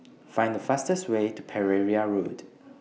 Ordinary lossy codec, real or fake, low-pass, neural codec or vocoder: none; real; none; none